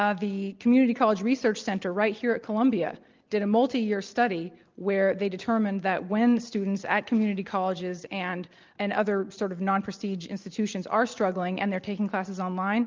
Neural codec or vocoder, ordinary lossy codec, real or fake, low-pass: none; Opus, 24 kbps; real; 7.2 kHz